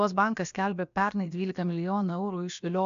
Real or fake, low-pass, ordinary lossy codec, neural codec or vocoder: fake; 7.2 kHz; AAC, 96 kbps; codec, 16 kHz, about 1 kbps, DyCAST, with the encoder's durations